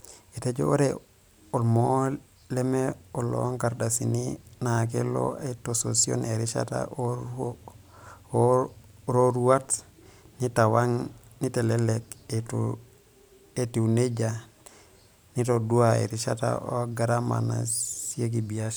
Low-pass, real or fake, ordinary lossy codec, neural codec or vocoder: none; fake; none; vocoder, 44.1 kHz, 128 mel bands every 512 samples, BigVGAN v2